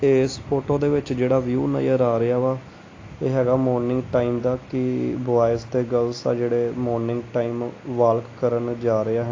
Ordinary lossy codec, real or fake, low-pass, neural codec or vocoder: AAC, 32 kbps; real; 7.2 kHz; none